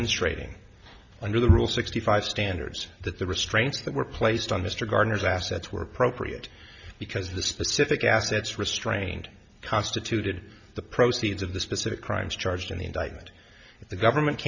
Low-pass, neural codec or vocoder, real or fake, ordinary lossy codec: 7.2 kHz; none; real; Opus, 64 kbps